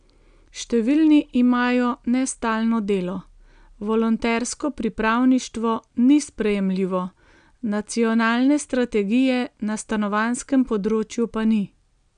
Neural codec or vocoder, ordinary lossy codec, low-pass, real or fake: none; none; 9.9 kHz; real